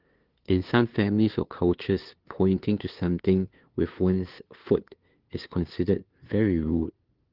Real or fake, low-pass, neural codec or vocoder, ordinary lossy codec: fake; 5.4 kHz; codec, 16 kHz, 2 kbps, FunCodec, trained on LibriTTS, 25 frames a second; Opus, 16 kbps